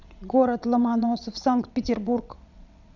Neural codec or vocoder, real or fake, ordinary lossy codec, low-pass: vocoder, 22.05 kHz, 80 mel bands, WaveNeXt; fake; none; 7.2 kHz